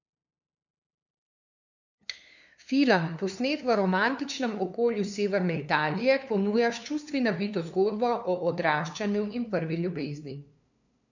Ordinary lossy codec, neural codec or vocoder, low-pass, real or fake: none; codec, 16 kHz, 2 kbps, FunCodec, trained on LibriTTS, 25 frames a second; 7.2 kHz; fake